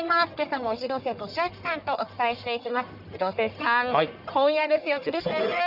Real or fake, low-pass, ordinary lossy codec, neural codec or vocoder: fake; 5.4 kHz; none; codec, 44.1 kHz, 1.7 kbps, Pupu-Codec